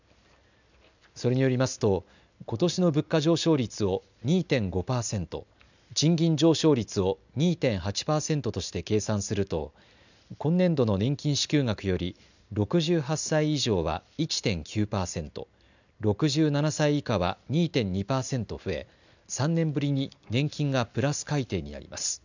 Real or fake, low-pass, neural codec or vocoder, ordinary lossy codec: real; 7.2 kHz; none; none